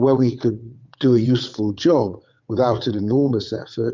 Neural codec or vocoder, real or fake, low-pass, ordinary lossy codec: vocoder, 22.05 kHz, 80 mel bands, WaveNeXt; fake; 7.2 kHz; MP3, 64 kbps